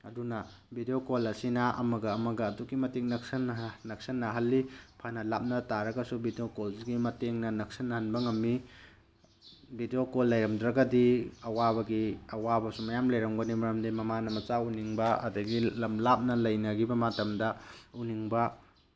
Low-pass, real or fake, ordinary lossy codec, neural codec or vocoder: none; real; none; none